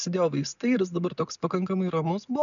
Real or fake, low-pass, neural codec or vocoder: real; 7.2 kHz; none